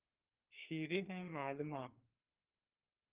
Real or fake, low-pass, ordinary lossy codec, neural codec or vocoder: fake; 3.6 kHz; Opus, 32 kbps; codec, 44.1 kHz, 2.6 kbps, SNAC